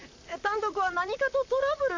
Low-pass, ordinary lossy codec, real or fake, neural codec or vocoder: 7.2 kHz; MP3, 64 kbps; fake; vocoder, 44.1 kHz, 128 mel bands, Pupu-Vocoder